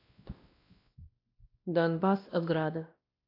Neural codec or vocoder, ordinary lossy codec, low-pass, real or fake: codec, 16 kHz, 1 kbps, X-Codec, WavLM features, trained on Multilingual LibriSpeech; AAC, 32 kbps; 5.4 kHz; fake